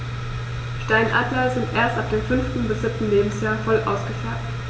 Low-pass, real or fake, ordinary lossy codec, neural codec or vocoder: none; real; none; none